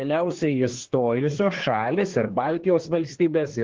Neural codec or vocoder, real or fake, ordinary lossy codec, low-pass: codec, 24 kHz, 1 kbps, SNAC; fake; Opus, 16 kbps; 7.2 kHz